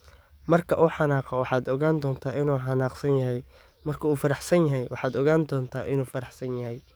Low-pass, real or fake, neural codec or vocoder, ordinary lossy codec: none; fake; codec, 44.1 kHz, 7.8 kbps, DAC; none